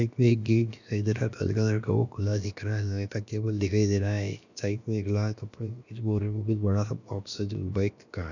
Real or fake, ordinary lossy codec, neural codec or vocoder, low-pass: fake; none; codec, 16 kHz, about 1 kbps, DyCAST, with the encoder's durations; 7.2 kHz